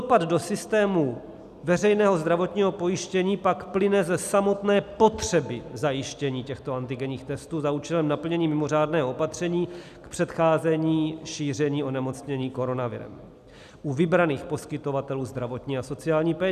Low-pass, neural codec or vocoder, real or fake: 14.4 kHz; none; real